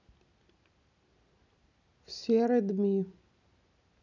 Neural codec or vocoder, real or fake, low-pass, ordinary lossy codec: none; real; 7.2 kHz; none